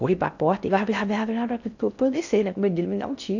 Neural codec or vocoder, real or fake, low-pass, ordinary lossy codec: codec, 16 kHz in and 24 kHz out, 0.6 kbps, FocalCodec, streaming, 4096 codes; fake; 7.2 kHz; none